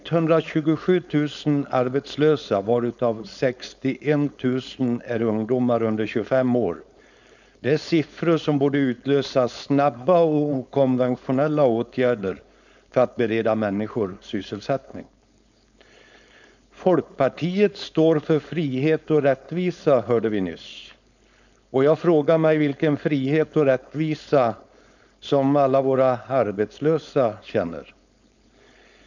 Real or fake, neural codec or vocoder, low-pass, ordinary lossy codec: fake; codec, 16 kHz, 4.8 kbps, FACodec; 7.2 kHz; none